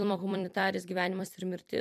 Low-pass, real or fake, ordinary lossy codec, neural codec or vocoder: 14.4 kHz; fake; MP3, 96 kbps; vocoder, 44.1 kHz, 128 mel bands every 256 samples, BigVGAN v2